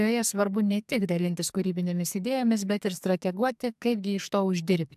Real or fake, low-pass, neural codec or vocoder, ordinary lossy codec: fake; 14.4 kHz; codec, 44.1 kHz, 2.6 kbps, SNAC; AAC, 96 kbps